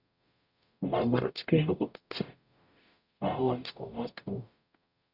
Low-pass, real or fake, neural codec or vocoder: 5.4 kHz; fake; codec, 44.1 kHz, 0.9 kbps, DAC